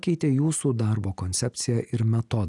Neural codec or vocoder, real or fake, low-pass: none; real; 10.8 kHz